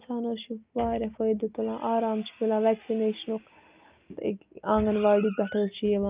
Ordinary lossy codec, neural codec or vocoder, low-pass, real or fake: Opus, 24 kbps; none; 3.6 kHz; real